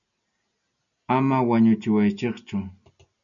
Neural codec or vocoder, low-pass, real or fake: none; 7.2 kHz; real